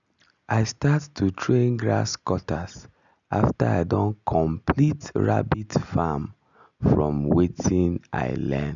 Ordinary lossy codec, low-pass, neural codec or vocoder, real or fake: none; 7.2 kHz; none; real